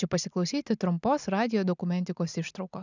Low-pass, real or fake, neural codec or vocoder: 7.2 kHz; real; none